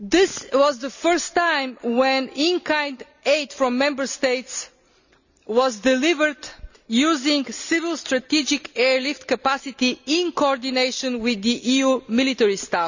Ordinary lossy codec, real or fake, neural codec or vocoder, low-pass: none; real; none; 7.2 kHz